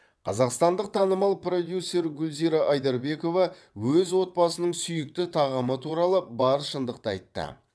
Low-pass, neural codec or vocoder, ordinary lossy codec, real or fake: none; vocoder, 22.05 kHz, 80 mel bands, WaveNeXt; none; fake